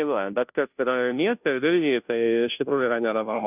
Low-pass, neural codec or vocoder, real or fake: 3.6 kHz; codec, 16 kHz, 0.5 kbps, FunCodec, trained on Chinese and English, 25 frames a second; fake